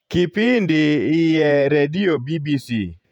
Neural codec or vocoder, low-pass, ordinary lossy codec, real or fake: vocoder, 48 kHz, 128 mel bands, Vocos; 19.8 kHz; none; fake